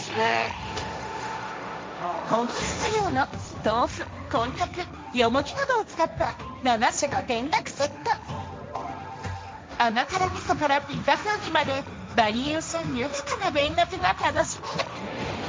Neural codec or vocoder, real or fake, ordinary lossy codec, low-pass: codec, 16 kHz, 1.1 kbps, Voila-Tokenizer; fake; none; none